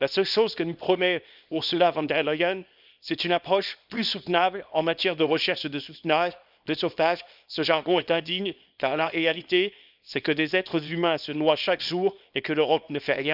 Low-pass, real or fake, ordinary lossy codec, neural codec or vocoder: 5.4 kHz; fake; none; codec, 24 kHz, 0.9 kbps, WavTokenizer, small release